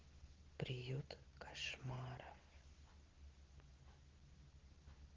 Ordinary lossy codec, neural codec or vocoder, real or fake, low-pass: Opus, 16 kbps; none; real; 7.2 kHz